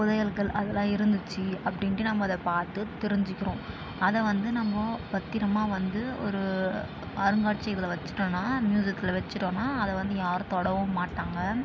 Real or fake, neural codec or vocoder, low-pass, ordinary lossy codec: real; none; none; none